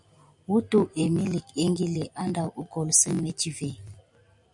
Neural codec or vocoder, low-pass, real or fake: none; 10.8 kHz; real